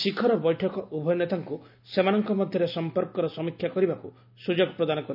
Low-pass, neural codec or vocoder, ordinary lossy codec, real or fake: 5.4 kHz; none; none; real